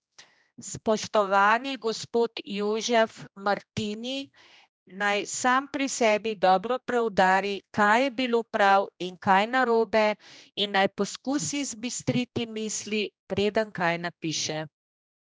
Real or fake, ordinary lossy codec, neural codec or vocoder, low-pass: fake; none; codec, 16 kHz, 1 kbps, X-Codec, HuBERT features, trained on general audio; none